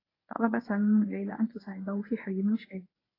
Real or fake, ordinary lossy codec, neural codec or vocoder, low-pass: fake; AAC, 24 kbps; codec, 24 kHz, 0.9 kbps, WavTokenizer, medium speech release version 1; 5.4 kHz